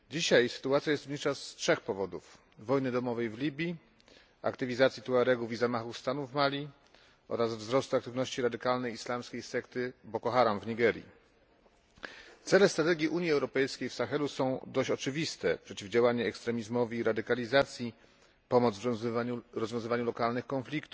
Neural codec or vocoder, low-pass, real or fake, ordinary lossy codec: none; none; real; none